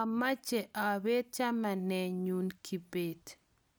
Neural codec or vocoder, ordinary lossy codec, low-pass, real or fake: none; none; none; real